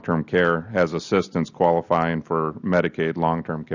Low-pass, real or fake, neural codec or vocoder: 7.2 kHz; real; none